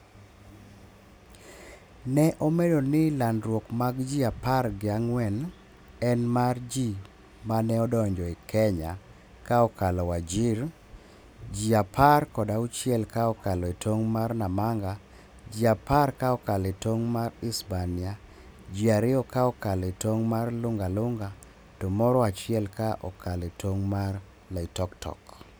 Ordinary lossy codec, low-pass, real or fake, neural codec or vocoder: none; none; real; none